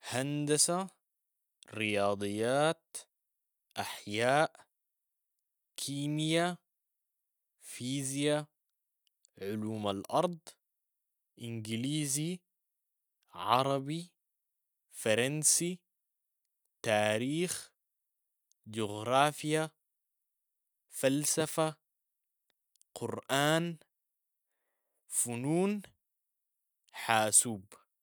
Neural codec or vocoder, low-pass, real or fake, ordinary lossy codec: none; none; real; none